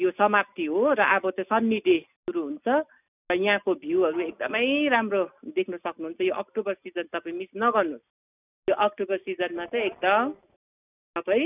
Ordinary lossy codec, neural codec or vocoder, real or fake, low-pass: none; none; real; 3.6 kHz